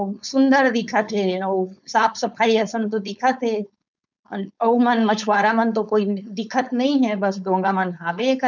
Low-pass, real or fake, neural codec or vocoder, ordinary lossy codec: 7.2 kHz; fake; codec, 16 kHz, 4.8 kbps, FACodec; none